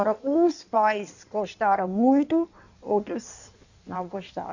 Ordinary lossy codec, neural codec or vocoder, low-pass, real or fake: none; codec, 16 kHz in and 24 kHz out, 1.1 kbps, FireRedTTS-2 codec; 7.2 kHz; fake